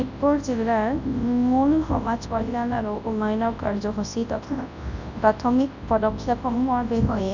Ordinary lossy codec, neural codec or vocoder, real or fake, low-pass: Opus, 64 kbps; codec, 24 kHz, 0.9 kbps, WavTokenizer, large speech release; fake; 7.2 kHz